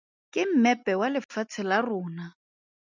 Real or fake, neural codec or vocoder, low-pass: real; none; 7.2 kHz